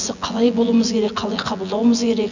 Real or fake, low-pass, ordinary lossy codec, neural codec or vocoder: fake; 7.2 kHz; none; vocoder, 24 kHz, 100 mel bands, Vocos